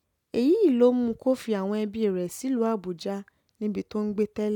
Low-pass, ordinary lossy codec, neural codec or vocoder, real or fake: 19.8 kHz; none; none; real